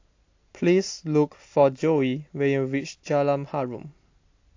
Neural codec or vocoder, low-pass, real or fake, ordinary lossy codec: none; 7.2 kHz; real; AAC, 48 kbps